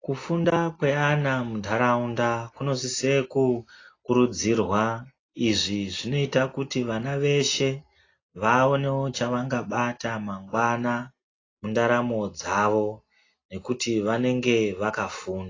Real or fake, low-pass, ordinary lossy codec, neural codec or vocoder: real; 7.2 kHz; AAC, 32 kbps; none